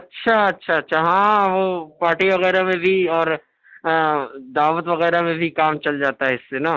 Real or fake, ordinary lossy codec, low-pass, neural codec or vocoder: real; Opus, 24 kbps; 7.2 kHz; none